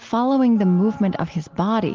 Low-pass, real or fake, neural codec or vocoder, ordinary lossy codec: 7.2 kHz; real; none; Opus, 16 kbps